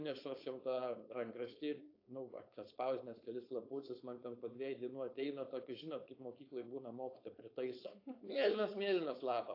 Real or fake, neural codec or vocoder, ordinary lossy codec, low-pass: fake; codec, 16 kHz, 4.8 kbps, FACodec; AAC, 32 kbps; 5.4 kHz